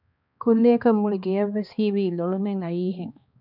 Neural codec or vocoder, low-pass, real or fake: codec, 16 kHz, 2 kbps, X-Codec, HuBERT features, trained on balanced general audio; 5.4 kHz; fake